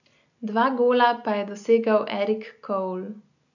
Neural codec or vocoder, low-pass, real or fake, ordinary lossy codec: none; 7.2 kHz; real; none